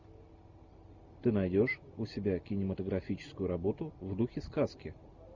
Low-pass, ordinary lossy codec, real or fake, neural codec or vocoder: 7.2 kHz; Opus, 64 kbps; real; none